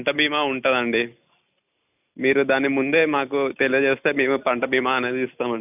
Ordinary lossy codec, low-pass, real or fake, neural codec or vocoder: none; 3.6 kHz; real; none